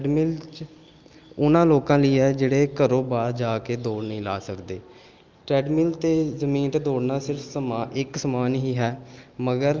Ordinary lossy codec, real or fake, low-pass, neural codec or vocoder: Opus, 32 kbps; real; 7.2 kHz; none